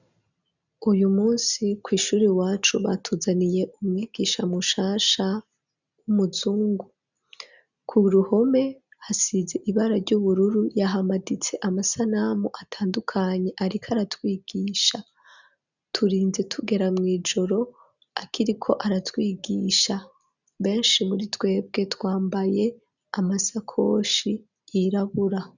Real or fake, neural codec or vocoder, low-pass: real; none; 7.2 kHz